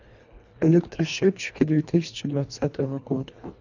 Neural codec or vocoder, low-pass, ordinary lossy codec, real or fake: codec, 24 kHz, 1.5 kbps, HILCodec; 7.2 kHz; none; fake